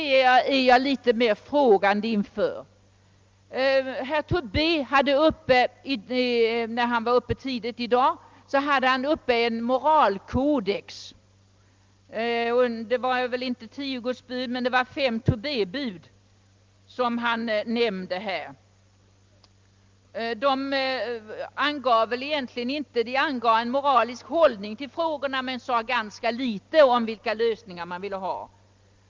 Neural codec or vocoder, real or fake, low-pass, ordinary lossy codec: none; real; 7.2 kHz; Opus, 32 kbps